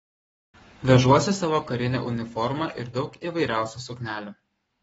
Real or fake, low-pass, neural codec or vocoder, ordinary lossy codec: fake; 19.8 kHz; codec, 44.1 kHz, 7.8 kbps, Pupu-Codec; AAC, 24 kbps